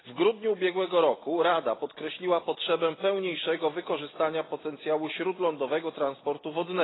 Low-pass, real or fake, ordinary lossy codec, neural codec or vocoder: 7.2 kHz; real; AAC, 16 kbps; none